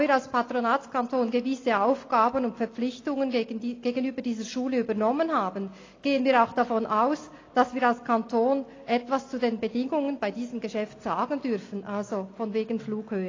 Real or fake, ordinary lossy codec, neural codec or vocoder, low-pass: real; AAC, 32 kbps; none; 7.2 kHz